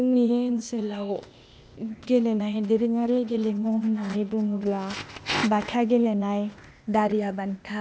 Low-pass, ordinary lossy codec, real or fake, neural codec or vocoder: none; none; fake; codec, 16 kHz, 0.8 kbps, ZipCodec